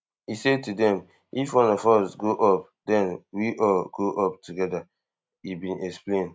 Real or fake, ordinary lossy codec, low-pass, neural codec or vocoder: real; none; none; none